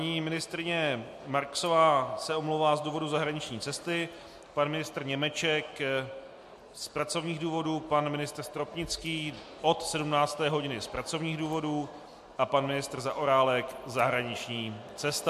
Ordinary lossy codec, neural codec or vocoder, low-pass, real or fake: MP3, 64 kbps; none; 14.4 kHz; real